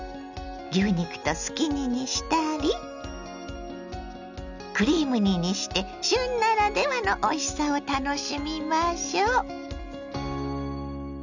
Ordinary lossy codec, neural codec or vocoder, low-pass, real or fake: none; none; 7.2 kHz; real